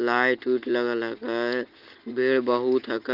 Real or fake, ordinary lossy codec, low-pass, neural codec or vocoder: real; Opus, 32 kbps; 5.4 kHz; none